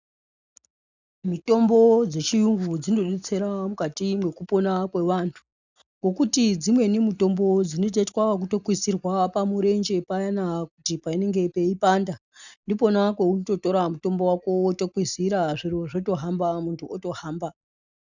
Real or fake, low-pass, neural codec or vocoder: real; 7.2 kHz; none